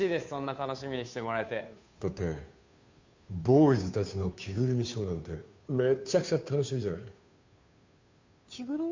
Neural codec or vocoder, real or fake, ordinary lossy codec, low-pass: codec, 16 kHz, 2 kbps, FunCodec, trained on Chinese and English, 25 frames a second; fake; MP3, 64 kbps; 7.2 kHz